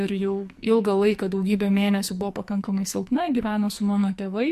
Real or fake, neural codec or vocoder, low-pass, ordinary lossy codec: fake; codec, 44.1 kHz, 2.6 kbps, SNAC; 14.4 kHz; MP3, 64 kbps